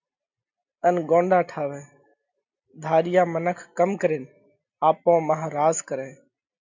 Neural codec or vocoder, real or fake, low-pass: none; real; 7.2 kHz